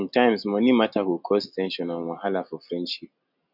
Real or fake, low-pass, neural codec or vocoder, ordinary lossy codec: real; 5.4 kHz; none; none